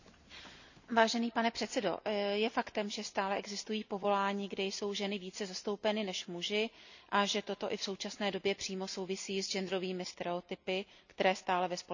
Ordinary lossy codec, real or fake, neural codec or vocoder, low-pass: none; real; none; 7.2 kHz